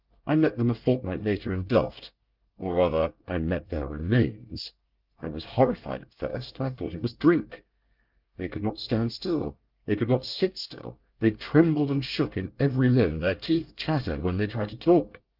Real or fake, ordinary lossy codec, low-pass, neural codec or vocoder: fake; Opus, 24 kbps; 5.4 kHz; codec, 24 kHz, 1 kbps, SNAC